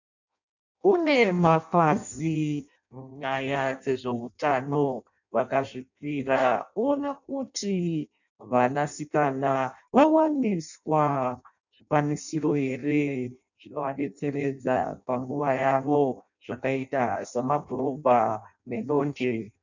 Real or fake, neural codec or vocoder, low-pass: fake; codec, 16 kHz in and 24 kHz out, 0.6 kbps, FireRedTTS-2 codec; 7.2 kHz